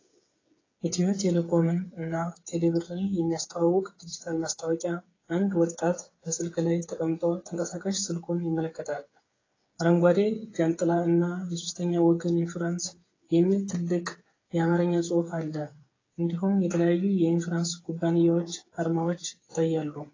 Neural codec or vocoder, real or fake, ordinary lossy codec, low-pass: codec, 16 kHz, 8 kbps, FreqCodec, smaller model; fake; AAC, 32 kbps; 7.2 kHz